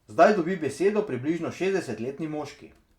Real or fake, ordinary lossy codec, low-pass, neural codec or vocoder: real; Opus, 64 kbps; 19.8 kHz; none